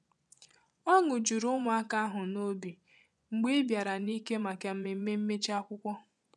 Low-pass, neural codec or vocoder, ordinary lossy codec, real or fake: none; vocoder, 24 kHz, 100 mel bands, Vocos; none; fake